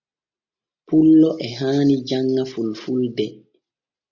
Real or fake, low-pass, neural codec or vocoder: real; 7.2 kHz; none